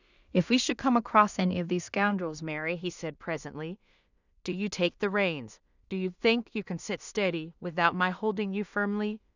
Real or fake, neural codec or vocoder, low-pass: fake; codec, 16 kHz in and 24 kHz out, 0.4 kbps, LongCat-Audio-Codec, two codebook decoder; 7.2 kHz